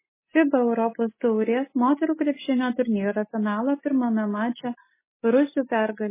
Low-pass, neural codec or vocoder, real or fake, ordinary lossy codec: 3.6 kHz; none; real; MP3, 16 kbps